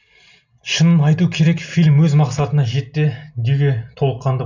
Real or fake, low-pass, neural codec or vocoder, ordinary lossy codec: real; 7.2 kHz; none; none